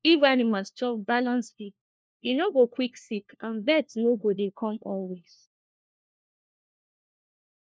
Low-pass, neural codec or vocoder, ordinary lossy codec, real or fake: none; codec, 16 kHz, 1 kbps, FunCodec, trained on LibriTTS, 50 frames a second; none; fake